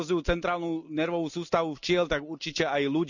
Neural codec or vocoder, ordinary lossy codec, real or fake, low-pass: none; none; real; 7.2 kHz